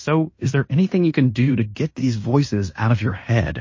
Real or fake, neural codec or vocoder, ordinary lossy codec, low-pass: fake; codec, 16 kHz in and 24 kHz out, 0.9 kbps, LongCat-Audio-Codec, fine tuned four codebook decoder; MP3, 32 kbps; 7.2 kHz